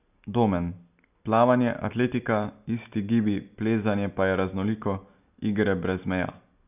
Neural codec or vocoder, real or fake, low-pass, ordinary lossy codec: none; real; 3.6 kHz; none